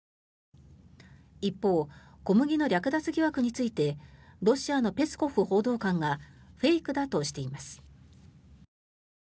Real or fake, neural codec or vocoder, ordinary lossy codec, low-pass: real; none; none; none